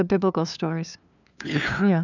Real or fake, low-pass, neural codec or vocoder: fake; 7.2 kHz; codec, 16 kHz, 2 kbps, FunCodec, trained on LibriTTS, 25 frames a second